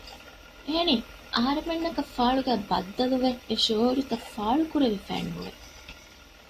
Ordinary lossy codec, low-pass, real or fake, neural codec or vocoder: AAC, 48 kbps; 14.4 kHz; real; none